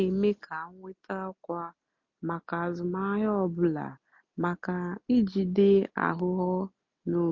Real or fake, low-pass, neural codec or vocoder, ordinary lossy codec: real; 7.2 kHz; none; MP3, 48 kbps